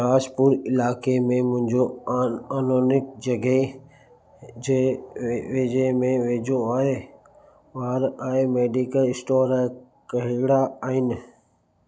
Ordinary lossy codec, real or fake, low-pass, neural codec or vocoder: none; real; none; none